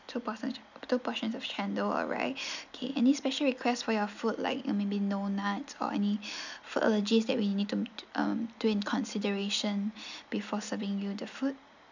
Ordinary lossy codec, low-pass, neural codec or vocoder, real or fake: none; 7.2 kHz; none; real